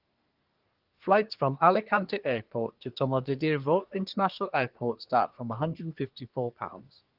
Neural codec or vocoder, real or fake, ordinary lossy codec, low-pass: codec, 24 kHz, 1 kbps, SNAC; fake; Opus, 32 kbps; 5.4 kHz